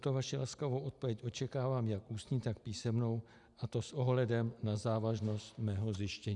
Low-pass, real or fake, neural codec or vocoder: 10.8 kHz; real; none